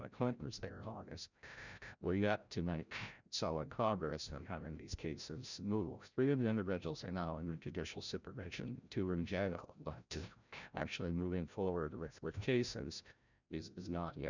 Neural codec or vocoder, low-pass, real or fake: codec, 16 kHz, 0.5 kbps, FreqCodec, larger model; 7.2 kHz; fake